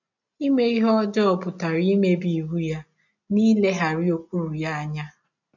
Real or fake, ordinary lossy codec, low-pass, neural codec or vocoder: real; none; 7.2 kHz; none